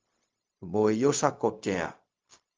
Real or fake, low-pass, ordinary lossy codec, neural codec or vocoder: fake; 7.2 kHz; Opus, 24 kbps; codec, 16 kHz, 0.4 kbps, LongCat-Audio-Codec